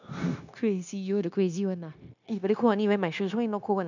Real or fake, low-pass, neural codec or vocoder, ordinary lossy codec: fake; 7.2 kHz; codec, 16 kHz, 0.9 kbps, LongCat-Audio-Codec; none